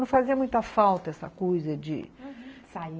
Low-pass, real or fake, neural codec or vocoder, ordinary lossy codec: none; real; none; none